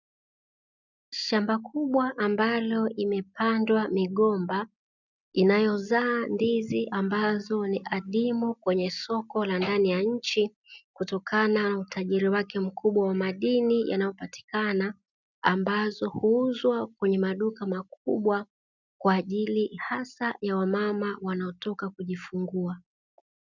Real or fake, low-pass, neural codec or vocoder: real; 7.2 kHz; none